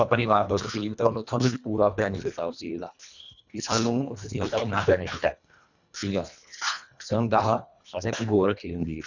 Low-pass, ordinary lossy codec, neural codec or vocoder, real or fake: 7.2 kHz; none; codec, 24 kHz, 1.5 kbps, HILCodec; fake